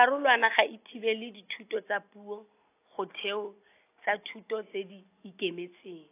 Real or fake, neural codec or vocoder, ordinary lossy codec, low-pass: real; none; AAC, 24 kbps; 3.6 kHz